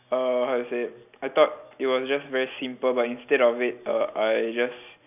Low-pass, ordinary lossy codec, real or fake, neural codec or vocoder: 3.6 kHz; none; real; none